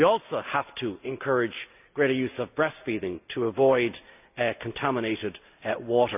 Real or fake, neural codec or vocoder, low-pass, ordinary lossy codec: real; none; 3.6 kHz; none